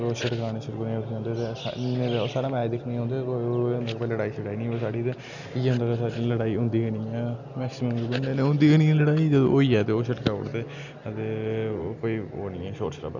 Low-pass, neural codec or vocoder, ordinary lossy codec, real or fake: 7.2 kHz; none; none; real